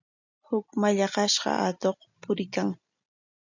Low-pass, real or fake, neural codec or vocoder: 7.2 kHz; real; none